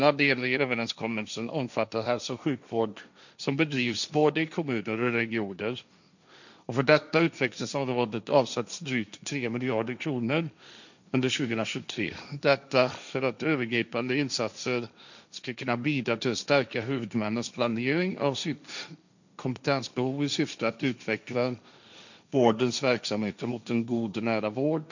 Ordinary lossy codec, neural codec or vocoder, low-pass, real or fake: none; codec, 16 kHz, 1.1 kbps, Voila-Tokenizer; 7.2 kHz; fake